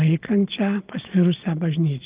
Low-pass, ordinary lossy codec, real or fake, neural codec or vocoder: 3.6 kHz; Opus, 32 kbps; fake; vocoder, 22.05 kHz, 80 mel bands, Vocos